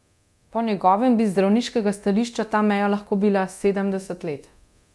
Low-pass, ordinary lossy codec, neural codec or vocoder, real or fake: none; none; codec, 24 kHz, 0.9 kbps, DualCodec; fake